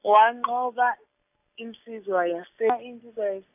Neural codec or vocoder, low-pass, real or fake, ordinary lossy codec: none; 3.6 kHz; real; AAC, 32 kbps